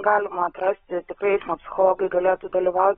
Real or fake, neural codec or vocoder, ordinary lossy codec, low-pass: fake; codec, 16 kHz, 16 kbps, FunCodec, trained on LibriTTS, 50 frames a second; AAC, 16 kbps; 7.2 kHz